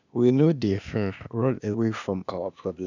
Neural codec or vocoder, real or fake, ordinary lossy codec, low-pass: codec, 16 kHz, 0.8 kbps, ZipCodec; fake; none; 7.2 kHz